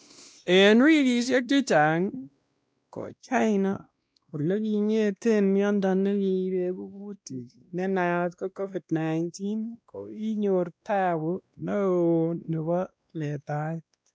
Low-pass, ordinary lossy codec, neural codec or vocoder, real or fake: none; none; codec, 16 kHz, 1 kbps, X-Codec, WavLM features, trained on Multilingual LibriSpeech; fake